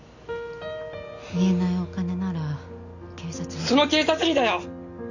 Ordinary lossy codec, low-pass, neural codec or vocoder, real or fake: none; 7.2 kHz; none; real